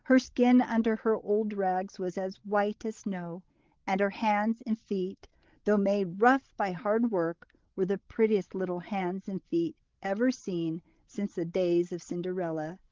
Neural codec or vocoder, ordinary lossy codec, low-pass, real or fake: codec, 16 kHz, 16 kbps, FreqCodec, larger model; Opus, 16 kbps; 7.2 kHz; fake